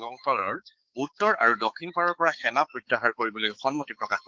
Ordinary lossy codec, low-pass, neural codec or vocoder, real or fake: Opus, 16 kbps; 7.2 kHz; codec, 16 kHz, 4 kbps, X-Codec, HuBERT features, trained on balanced general audio; fake